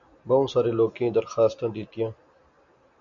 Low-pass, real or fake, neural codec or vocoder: 7.2 kHz; real; none